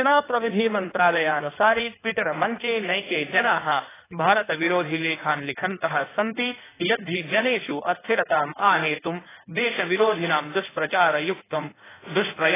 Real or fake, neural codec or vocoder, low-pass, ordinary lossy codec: fake; codec, 16 kHz in and 24 kHz out, 1.1 kbps, FireRedTTS-2 codec; 3.6 kHz; AAC, 16 kbps